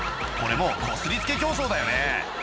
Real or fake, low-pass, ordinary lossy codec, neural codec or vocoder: real; none; none; none